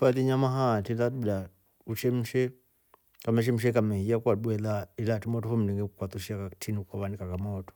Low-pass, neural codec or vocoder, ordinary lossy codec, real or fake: none; none; none; real